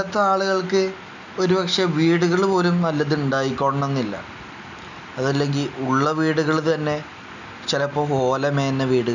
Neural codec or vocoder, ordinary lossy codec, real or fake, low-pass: none; none; real; 7.2 kHz